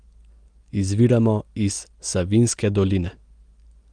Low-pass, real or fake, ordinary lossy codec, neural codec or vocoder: 9.9 kHz; real; Opus, 24 kbps; none